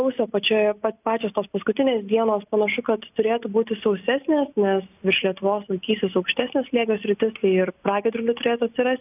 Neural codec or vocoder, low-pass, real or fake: none; 3.6 kHz; real